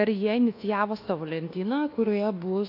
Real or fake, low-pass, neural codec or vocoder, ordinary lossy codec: fake; 5.4 kHz; codec, 24 kHz, 1.2 kbps, DualCodec; AAC, 32 kbps